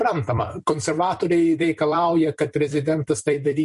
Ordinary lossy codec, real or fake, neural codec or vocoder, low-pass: MP3, 48 kbps; fake; vocoder, 44.1 kHz, 128 mel bands every 256 samples, BigVGAN v2; 14.4 kHz